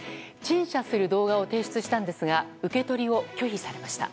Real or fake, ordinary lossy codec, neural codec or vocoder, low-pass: real; none; none; none